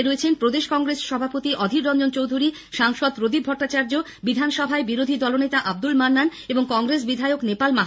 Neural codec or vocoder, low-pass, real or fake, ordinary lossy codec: none; none; real; none